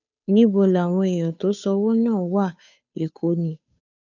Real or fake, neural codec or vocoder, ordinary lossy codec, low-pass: fake; codec, 16 kHz, 2 kbps, FunCodec, trained on Chinese and English, 25 frames a second; none; 7.2 kHz